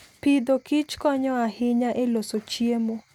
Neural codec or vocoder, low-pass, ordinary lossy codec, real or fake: none; 19.8 kHz; none; real